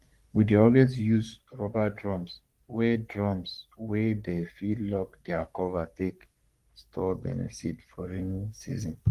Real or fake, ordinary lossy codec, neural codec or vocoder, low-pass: fake; Opus, 32 kbps; codec, 44.1 kHz, 3.4 kbps, Pupu-Codec; 14.4 kHz